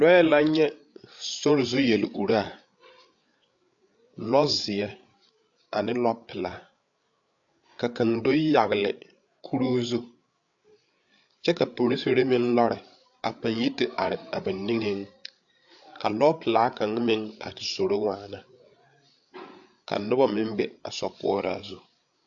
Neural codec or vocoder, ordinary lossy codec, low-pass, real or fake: codec, 16 kHz, 8 kbps, FreqCodec, larger model; AAC, 64 kbps; 7.2 kHz; fake